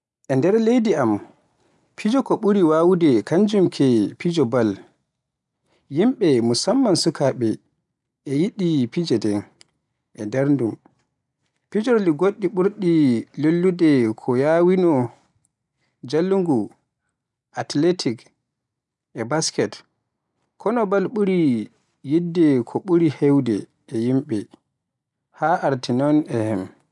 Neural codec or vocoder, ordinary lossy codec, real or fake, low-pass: none; none; real; 10.8 kHz